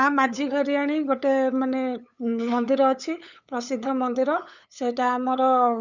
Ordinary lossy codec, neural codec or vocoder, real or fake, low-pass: none; codec, 16 kHz, 8 kbps, FunCodec, trained on LibriTTS, 25 frames a second; fake; 7.2 kHz